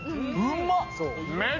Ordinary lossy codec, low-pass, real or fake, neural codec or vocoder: none; 7.2 kHz; real; none